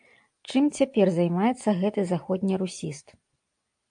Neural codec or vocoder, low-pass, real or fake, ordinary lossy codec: none; 9.9 kHz; real; AAC, 64 kbps